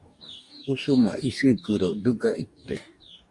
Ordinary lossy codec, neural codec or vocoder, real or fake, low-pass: Opus, 64 kbps; codec, 44.1 kHz, 2.6 kbps, DAC; fake; 10.8 kHz